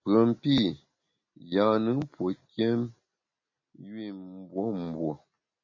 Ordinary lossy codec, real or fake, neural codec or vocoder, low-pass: MP3, 32 kbps; real; none; 7.2 kHz